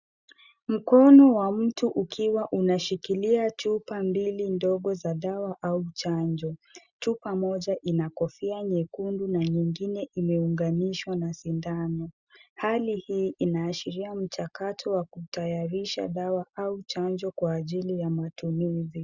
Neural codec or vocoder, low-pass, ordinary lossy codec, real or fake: none; 7.2 kHz; Opus, 64 kbps; real